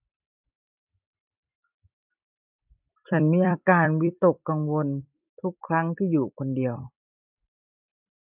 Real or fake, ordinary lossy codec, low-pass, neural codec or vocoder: fake; none; 3.6 kHz; vocoder, 44.1 kHz, 128 mel bands every 256 samples, BigVGAN v2